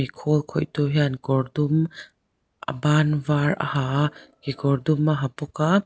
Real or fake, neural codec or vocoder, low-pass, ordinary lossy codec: real; none; none; none